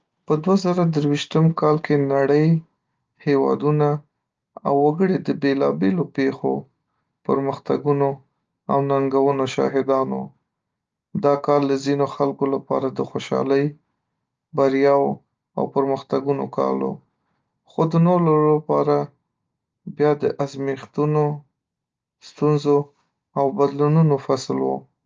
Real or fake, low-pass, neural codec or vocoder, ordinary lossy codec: real; 7.2 kHz; none; Opus, 24 kbps